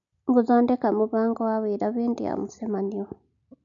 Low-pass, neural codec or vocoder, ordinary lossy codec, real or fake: 7.2 kHz; none; none; real